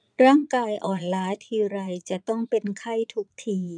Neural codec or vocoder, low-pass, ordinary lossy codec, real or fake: vocoder, 22.05 kHz, 80 mel bands, Vocos; 9.9 kHz; none; fake